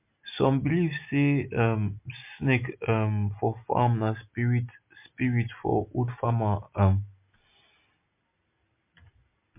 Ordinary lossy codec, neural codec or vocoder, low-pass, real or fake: MP3, 32 kbps; none; 3.6 kHz; real